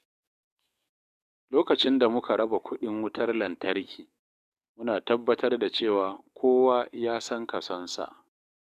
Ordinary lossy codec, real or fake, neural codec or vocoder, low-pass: none; fake; codec, 44.1 kHz, 7.8 kbps, DAC; 14.4 kHz